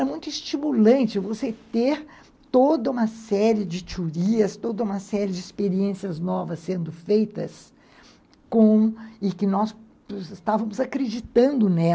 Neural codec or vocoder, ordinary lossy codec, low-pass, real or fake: none; none; none; real